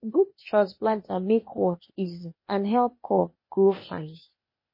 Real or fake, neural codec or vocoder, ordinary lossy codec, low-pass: fake; codec, 16 kHz, 0.8 kbps, ZipCodec; MP3, 24 kbps; 5.4 kHz